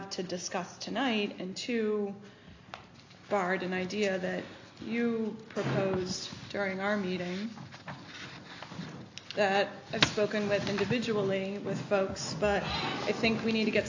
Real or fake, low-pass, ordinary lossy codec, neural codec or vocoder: real; 7.2 kHz; AAC, 48 kbps; none